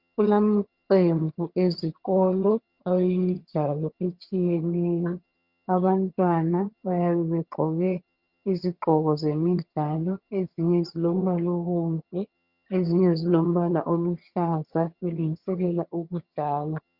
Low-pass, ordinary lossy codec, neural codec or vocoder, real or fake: 5.4 kHz; Opus, 64 kbps; vocoder, 22.05 kHz, 80 mel bands, HiFi-GAN; fake